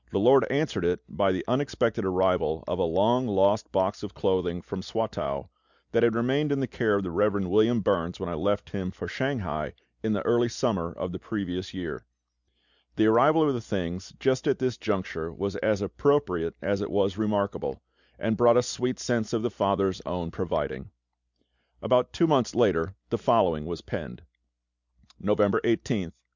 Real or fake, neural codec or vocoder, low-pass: real; none; 7.2 kHz